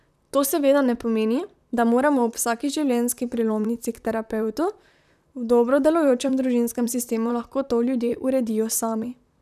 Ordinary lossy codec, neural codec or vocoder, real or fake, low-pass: none; vocoder, 44.1 kHz, 128 mel bands, Pupu-Vocoder; fake; 14.4 kHz